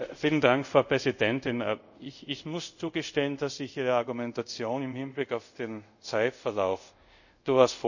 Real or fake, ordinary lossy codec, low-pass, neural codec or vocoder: fake; none; 7.2 kHz; codec, 24 kHz, 0.5 kbps, DualCodec